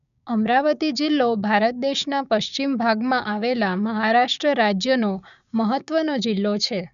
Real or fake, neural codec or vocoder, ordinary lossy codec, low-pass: fake; codec, 16 kHz, 6 kbps, DAC; none; 7.2 kHz